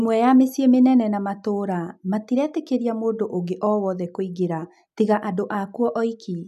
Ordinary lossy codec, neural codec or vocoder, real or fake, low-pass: none; none; real; 14.4 kHz